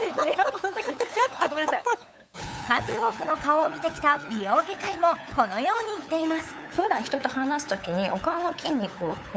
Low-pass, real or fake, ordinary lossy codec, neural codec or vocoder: none; fake; none; codec, 16 kHz, 8 kbps, FunCodec, trained on LibriTTS, 25 frames a second